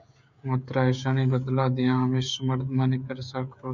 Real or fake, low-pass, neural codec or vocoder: fake; 7.2 kHz; codec, 16 kHz, 16 kbps, FreqCodec, smaller model